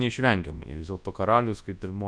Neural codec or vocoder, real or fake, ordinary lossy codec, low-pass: codec, 24 kHz, 0.9 kbps, WavTokenizer, large speech release; fake; Opus, 64 kbps; 9.9 kHz